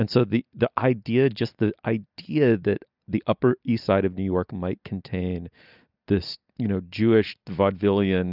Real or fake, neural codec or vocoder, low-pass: real; none; 5.4 kHz